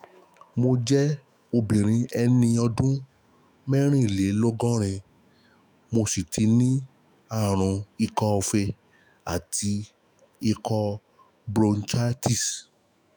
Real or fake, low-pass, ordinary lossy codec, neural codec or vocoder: fake; none; none; autoencoder, 48 kHz, 128 numbers a frame, DAC-VAE, trained on Japanese speech